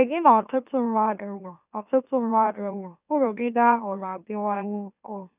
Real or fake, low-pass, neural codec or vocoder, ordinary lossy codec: fake; 3.6 kHz; autoencoder, 44.1 kHz, a latent of 192 numbers a frame, MeloTTS; none